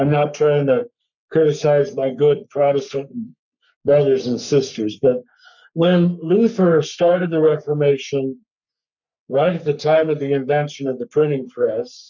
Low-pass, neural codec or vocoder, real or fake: 7.2 kHz; codec, 44.1 kHz, 3.4 kbps, Pupu-Codec; fake